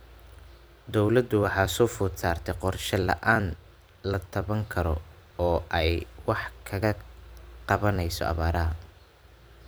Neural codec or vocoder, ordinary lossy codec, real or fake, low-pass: vocoder, 44.1 kHz, 128 mel bands every 256 samples, BigVGAN v2; none; fake; none